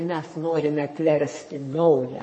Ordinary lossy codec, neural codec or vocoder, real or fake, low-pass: MP3, 32 kbps; codec, 44.1 kHz, 3.4 kbps, Pupu-Codec; fake; 9.9 kHz